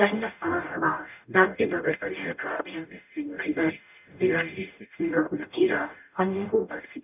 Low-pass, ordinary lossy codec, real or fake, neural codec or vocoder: 3.6 kHz; none; fake; codec, 44.1 kHz, 0.9 kbps, DAC